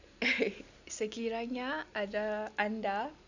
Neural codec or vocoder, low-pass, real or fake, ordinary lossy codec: none; 7.2 kHz; real; none